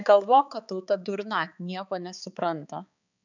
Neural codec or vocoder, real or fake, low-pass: codec, 16 kHz, 4 kbps, X-Codec, HuBERT features, trained on balanced general audio; fake; 7.2 kHz